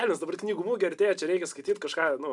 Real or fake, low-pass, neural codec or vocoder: real; 10.8 kHz; none